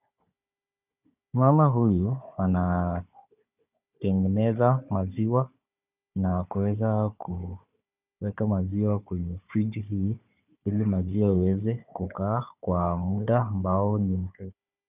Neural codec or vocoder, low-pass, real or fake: codec, 16 kHz, 4 kbps, FunCodec, trained on Chinese and English, 50 frames a second; 3.6 kHz; fake